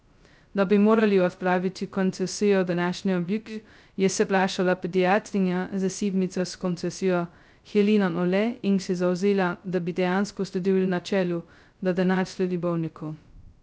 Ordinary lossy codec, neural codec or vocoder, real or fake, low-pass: none; codec, 16 kHz, 0.2 kbps, FocalCodec; fake; none